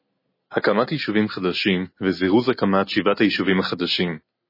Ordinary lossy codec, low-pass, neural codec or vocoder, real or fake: MP3, 24 kbps; 5.4 kHz; none; real